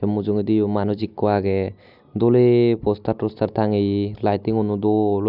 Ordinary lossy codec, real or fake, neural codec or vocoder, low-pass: none; real; none; 5.4 kHz